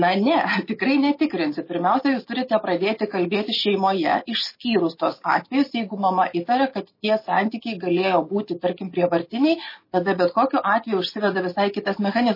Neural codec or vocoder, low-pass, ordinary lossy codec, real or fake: none; 5.4 kHz; MP3, 24 kbps; real